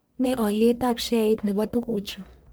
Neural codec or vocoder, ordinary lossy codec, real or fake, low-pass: codec, 44.1 kHz, 1.7 kbps, Pupu-Codec; none; fake; none